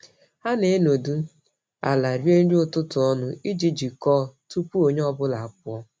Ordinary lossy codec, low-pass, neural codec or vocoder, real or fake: none; none; none; real